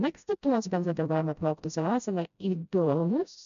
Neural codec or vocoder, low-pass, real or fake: codec, 16 kHz, 0.5 kbps, FreqCodec, smaller model; 7.2 kHz; fake